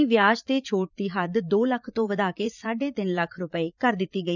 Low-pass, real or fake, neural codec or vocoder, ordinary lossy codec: 7.2 kHz; fake; vocoder, 44.1 kHz, 80 mel bands, Vocos; none